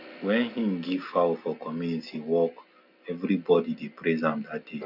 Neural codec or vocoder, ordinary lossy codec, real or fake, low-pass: none; none; real; 5.4 kHz